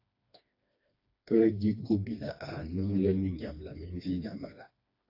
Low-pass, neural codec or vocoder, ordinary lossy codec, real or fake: 5.4 kHz; codec, 16 kHz, 2 kbps, FreqCodec, smaller model; AAC, 32 kbps; fake